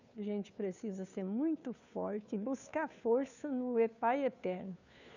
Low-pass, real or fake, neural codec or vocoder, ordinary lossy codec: 7.2 kHz; fake; codec, 16 kHz, 2 kbps, FunCodec, trained on Chinese and English, 25 frames a second; none